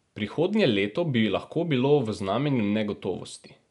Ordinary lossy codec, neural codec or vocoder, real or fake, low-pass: none; none; real; 10.8 kHz